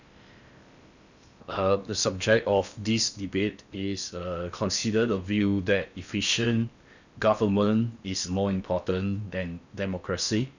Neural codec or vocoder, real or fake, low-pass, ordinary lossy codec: codec, 16 kHz in and 24 kHz out, 0.8 kbps, FocalCodec, streaming, 65536 codes; fake; 7.2 kHz; none